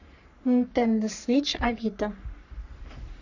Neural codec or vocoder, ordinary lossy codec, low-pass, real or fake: codec, 44.1 kHz, 3.4 kbps, Pupu-Codec; none; 7.2 kHz; fake